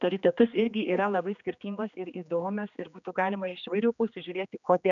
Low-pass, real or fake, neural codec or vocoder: 7.2 kHz; fake; codec, 16 kHz, 2 kbps, X-Codec, HuBERT features, trained on general audio